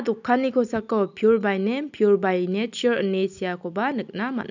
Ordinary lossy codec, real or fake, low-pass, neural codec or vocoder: none; real; 7.2 kHz; none